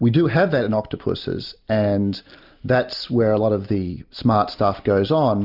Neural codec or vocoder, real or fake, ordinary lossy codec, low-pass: none; real; Opus, 64 kbps; 5.4 kHz